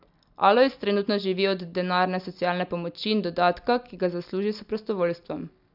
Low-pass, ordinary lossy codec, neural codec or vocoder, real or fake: 5.4 kHz; none; none; real